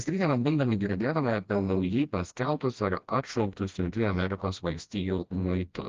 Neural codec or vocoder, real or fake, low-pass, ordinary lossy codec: codec, 16 kHz, 1 kbps, FreqCodec, smaller model; fake; 7.2 kHz; Opus, 32 kbps